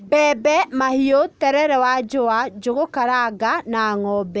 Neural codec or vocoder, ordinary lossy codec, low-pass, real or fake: none; none; none; real